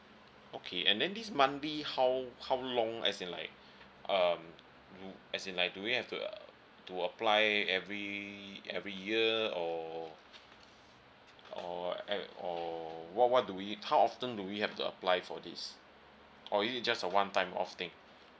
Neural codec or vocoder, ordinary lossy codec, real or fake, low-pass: none; none; real; none